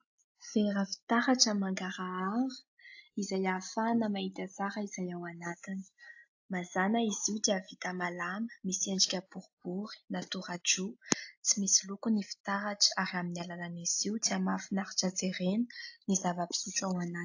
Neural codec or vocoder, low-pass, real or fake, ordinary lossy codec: none; 7.2 kHz; real; AAC, 48 kbps